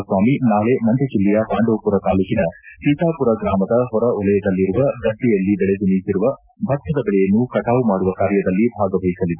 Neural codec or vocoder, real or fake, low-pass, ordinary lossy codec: none; real; 3.6 kHz; none